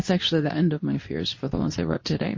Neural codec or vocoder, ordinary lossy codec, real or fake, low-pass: codec, 24 kHz, 0.9 kbps, WavTokenizer, medium speech release version 2; MP3, 32 kbps; fake; 7.2 kHz